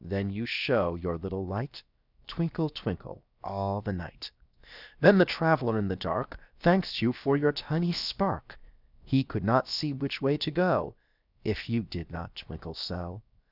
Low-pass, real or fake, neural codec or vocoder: 5.4 kHz; fake; codec, 16 kHz, 0.7 kbps, FocalCodec